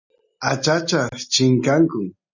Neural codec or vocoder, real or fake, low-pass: none; real; 7.2 kHz